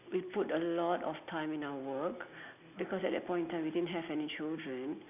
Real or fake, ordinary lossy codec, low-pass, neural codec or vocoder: real; none; 3.6 kHz; none